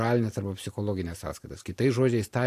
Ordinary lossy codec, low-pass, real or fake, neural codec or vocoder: AAC, 64 kbps; 14.4 kHz; fake; vocoder, 44.1 kHz, 128 mel bands every 512 samples, BigVGAN v2